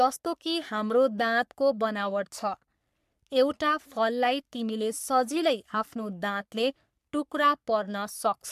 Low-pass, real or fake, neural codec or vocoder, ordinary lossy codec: 14.4 kHz; fake; codec, 44.1 kHz, 3.4 kbps, Pupu-Codec; MP3, 96 kbps